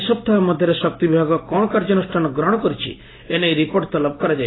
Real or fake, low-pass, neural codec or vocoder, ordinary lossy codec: real; 7.2 kHz; none; AAC, 16 kbps